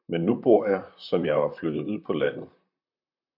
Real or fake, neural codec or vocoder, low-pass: fake; vocoder, 44.1 kHz, 128 mel bands, Pupu-Vocoder; 5.4 kHz